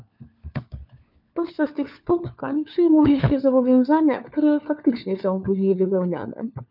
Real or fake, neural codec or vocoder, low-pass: fake; codec, 16 kHz, 4 kbps, FunCodec, trained on LibriTTS, 50 frames a second; 5.4 kHz